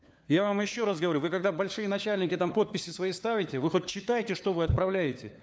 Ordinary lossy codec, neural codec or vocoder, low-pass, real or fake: none; codec, 16 kHz, 4 kbps, FunCodec, trained on LibriTTS, 50 frames a second; none; fake